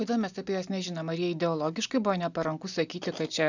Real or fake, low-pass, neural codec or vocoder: real; 7.2 kHz; none